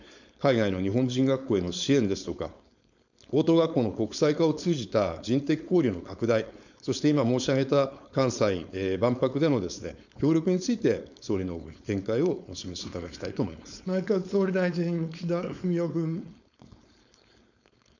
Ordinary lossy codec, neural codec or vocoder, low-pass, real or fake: none; codec, 16 kHz, 4.8 kbps, FACodec; 7.2 kHz; fake